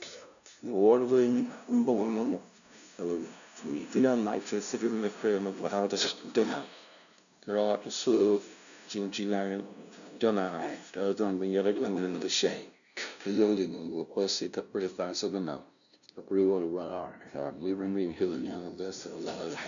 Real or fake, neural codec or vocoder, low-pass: fake; codec, 16 kHz, 0.5 kbps, FunCodec, trained on LibriTTS, 25 frames a second; 7.2 kHz